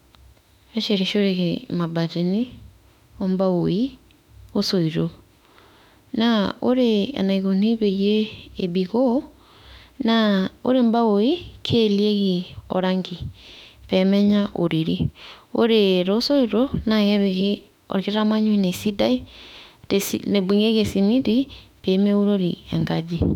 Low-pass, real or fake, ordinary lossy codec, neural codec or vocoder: 19.8 kHz; fake; none; autoencoder, 48 kHz, 32 numbers a frame, DAC-VAE, trained on Japanese speech